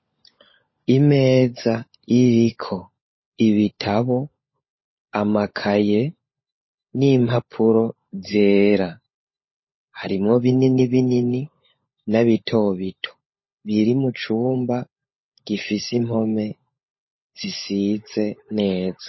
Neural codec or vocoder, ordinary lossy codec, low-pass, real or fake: codec, 16 kHz, 16 kbps, FunCodec, trained on LibriTTS, 50 frames a second; MP3, 24 kbps; 7.2 kHz; fake